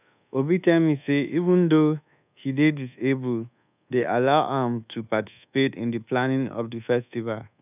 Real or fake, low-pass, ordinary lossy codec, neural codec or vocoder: fake; 3.6 kHz; none; codec, 24 kHz, 1.2 kbps, DualCodec